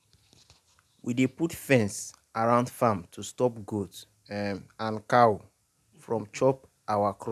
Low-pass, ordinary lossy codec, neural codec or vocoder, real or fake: 14.4 kHz; none; none; real